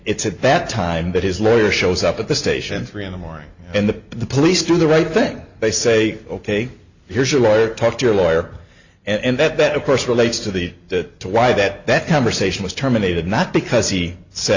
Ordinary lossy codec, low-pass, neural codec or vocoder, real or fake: Opus, 64 kbps; 7.2 kHz; none; real